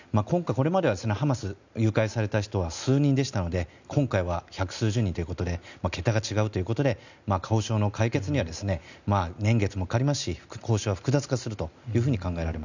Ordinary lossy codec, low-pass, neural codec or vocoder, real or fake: none; 7.2 kHz; none; real